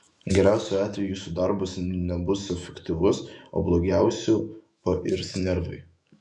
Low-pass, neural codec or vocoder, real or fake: 10.8 kHz; autoencoder, 48 kHz, 128 numbers a frame, DAC-VAE, trained on Japanese speech; fake